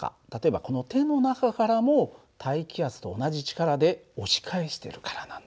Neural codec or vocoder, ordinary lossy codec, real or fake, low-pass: none; none; real; none